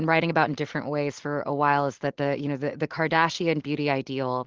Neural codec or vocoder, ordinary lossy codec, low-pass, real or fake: none; Opus, 16 kbps; 7.2 kHz; real